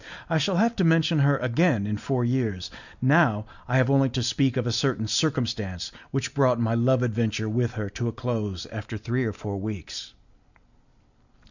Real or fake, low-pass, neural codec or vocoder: real; 7.2 kHz; none